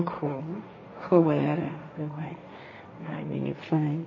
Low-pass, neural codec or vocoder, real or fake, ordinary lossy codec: 7.2 kHz; codec, 16 kHz, 1.1 kbps, Voila-Tokenizer; fake; MP3, 32 kbps